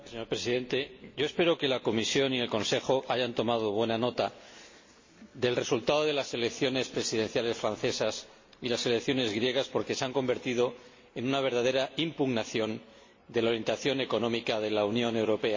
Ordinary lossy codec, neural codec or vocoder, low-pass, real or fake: MP3, 32 kbps; none; 7.2 kHz; real